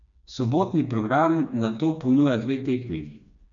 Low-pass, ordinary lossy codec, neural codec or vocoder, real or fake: 7.2 kHz; none; codec, 16 kHz, 2 kbps, FreqCodec, smaller model; fake